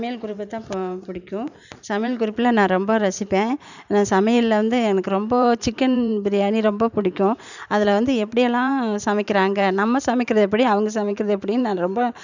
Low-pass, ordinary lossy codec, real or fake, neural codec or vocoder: 7.2 kHz; none; fake; vocoder, 44.1 kHz, 128 mel bands every 512 samples, BigVGAN v2